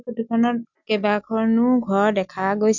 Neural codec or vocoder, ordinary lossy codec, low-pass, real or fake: none; none; 7.2 kHz; real